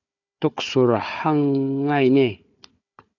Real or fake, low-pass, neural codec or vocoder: fake; 7.2 kHz; codec, 16 kHz, 4 kbps, FunCodec, trained on Chinese and English, 50 frames a second